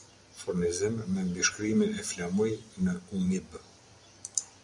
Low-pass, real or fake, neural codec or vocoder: 10.8 kHz; real; none